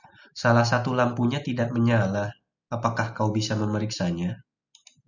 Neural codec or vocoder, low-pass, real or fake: none; 7.2 kHz; real